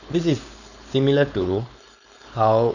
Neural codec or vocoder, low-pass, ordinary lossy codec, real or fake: codec, 16 kHz, 4.8 kbps, FACodec; 7.2 kHz; none; fake